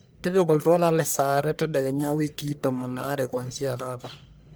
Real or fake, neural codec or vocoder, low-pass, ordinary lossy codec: fake; codec, 44.1 kHz, 1.7 kbps, Pupu-Codec; none; none